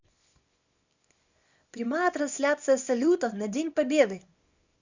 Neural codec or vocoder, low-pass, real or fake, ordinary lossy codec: codec, 24 kHz, 0.9 kbps, WavTokenizer, small release; 7.2 kHz; fake; Opus, 64 kbps